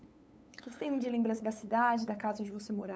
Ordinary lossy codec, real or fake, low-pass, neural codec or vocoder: none; fake; none; codec, 16 kHz, 8 kbps, FunCodec, trained on LibriTTS, 25 frames a second